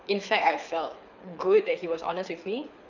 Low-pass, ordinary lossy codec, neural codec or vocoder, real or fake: 7.2 kHz; none; codec, 24 kHz, 6 kbps, HILCodec; fake